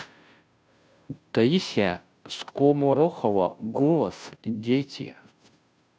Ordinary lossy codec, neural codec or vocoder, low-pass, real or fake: none; codec, 16 kHz, 0.5 kbps, FunCodec, trained on Chinese and English, 25 frames a second; none; fake